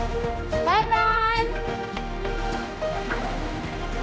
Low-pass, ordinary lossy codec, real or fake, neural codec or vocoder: none; none; fake; codec, 16 kHz, 1 kbps, X-Codec, HuBERT features, trained on general audio